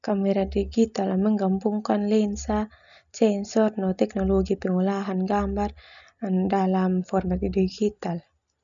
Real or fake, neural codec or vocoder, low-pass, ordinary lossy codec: real; none; 7.2 kHz; none